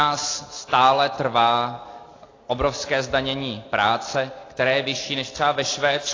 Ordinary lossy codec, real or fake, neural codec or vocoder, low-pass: AAC, 32 kbps; real; none; 7.2 kHz